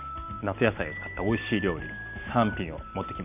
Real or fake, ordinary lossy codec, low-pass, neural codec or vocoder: real; none; 3.6 kHz; none